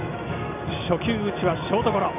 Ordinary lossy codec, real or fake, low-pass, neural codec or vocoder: none; real; 3.6 kHz; none